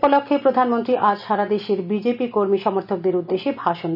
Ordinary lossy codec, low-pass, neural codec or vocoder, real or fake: none; 5.4 kHz; none; real